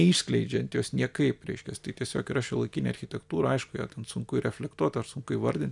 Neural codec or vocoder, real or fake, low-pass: none; real; 10.8 kHz